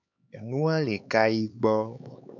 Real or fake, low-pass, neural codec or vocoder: fake; 7.2 kHz; codec, 16 kHz, 4 kbps, X-Codec, HuBERT features, trained on LibriSpeech